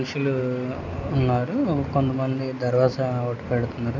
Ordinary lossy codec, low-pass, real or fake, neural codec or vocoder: none; 7.2 kHz; real; none